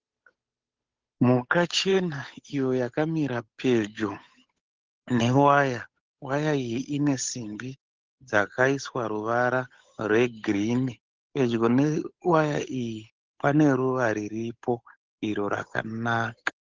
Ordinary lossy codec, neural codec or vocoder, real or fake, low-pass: Opus, 32 kbps; codec, 16 kHz, 8 kbps, FunCodec, trained on Chinese and English, 25 frames a second; fake; 7.2 kHz